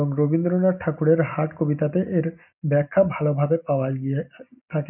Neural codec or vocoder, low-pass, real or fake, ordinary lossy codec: none; 3.6 kHz; real; none